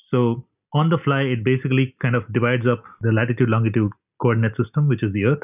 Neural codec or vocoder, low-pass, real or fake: none; 3.6 kHz; real